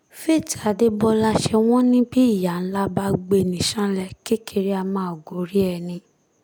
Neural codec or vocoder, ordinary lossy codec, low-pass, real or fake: none; none; none; real